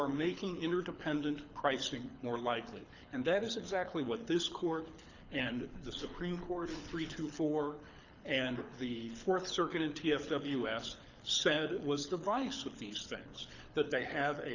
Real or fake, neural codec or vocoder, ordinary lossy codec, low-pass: fake; codec, 24 kHz, 6 kbps, HILCodec; Opus, 64 kbps; 7.2 kHz